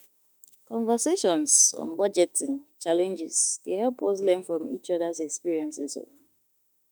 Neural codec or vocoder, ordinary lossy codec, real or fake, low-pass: autoencoder, 48 kHz, 32 numbers a frame, DAC-VAE, trained on Japanese speech; none; fake; none